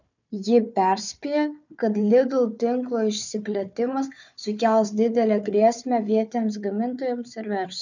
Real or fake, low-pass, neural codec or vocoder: fake; 7.2 kHz; codec, 16 kHz, 4 kbps, FunCodec, trained on Chinese and English, 50 frames a second